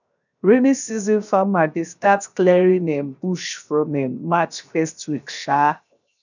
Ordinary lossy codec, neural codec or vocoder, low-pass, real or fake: none; codec, 16 kHz, 0.7 kbps, FocalCodec; 7.2 kHz; fake